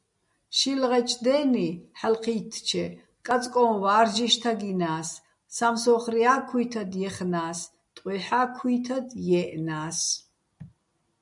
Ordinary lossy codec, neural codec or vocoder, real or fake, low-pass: MP3, 96 kbps; none; real; 10.8 kHz